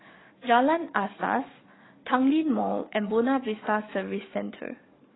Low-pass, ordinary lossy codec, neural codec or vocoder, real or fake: 7.2 kHz; AAC, 16 kbps; codec, 44.1 kHz, 7.8 kbps, DAC; fake